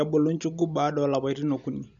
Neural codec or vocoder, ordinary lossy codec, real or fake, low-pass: none; none; real; 7.2 kHz